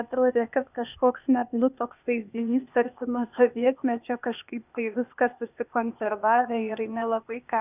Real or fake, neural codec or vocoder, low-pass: fake; codec, 16 kHz, 0.8 kbps, ZipCodec; 3.6 kHz